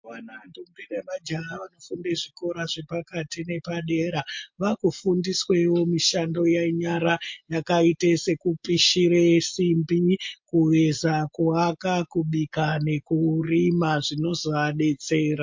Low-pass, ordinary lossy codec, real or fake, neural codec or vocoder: 7.2 kHz; MP3, 48 kbps; real; none